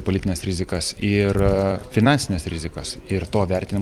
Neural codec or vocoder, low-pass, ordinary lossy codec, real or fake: vocoder, 44.1 kHz, 128 mel bands every 256 samples, BigVGAN v2; 19.8 kHz; Opus, 32 kbps; fake